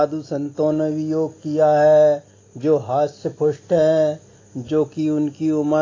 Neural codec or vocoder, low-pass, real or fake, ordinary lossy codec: none; 7.2 kHz; real; AAC, 32 kbps